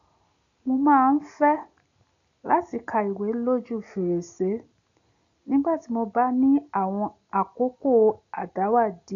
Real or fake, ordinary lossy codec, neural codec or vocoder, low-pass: real; none; none; 7.2 kHz